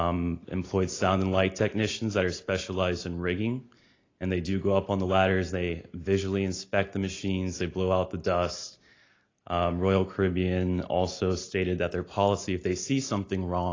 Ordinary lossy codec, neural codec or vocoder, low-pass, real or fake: AAC, 32 kbps; none; 7.2 kHz; real